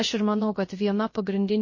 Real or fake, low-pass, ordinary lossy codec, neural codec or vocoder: fake; 7.2 kHz; MP3, 32 kbps; codec, 16 kHz, 0.3 kbps, FocalCodec